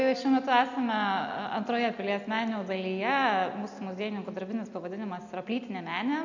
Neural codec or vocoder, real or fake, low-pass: none; real; 7.2 kHz